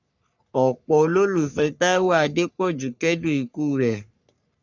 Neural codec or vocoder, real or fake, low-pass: codec, 44.1 kHz, 3.4 kbps, Pupu-Codec; fake; 7.2 kHz